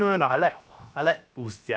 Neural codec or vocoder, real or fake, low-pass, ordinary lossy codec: codec, 16 kHz, 0.7 kbps, FocalCodec; fake; none; none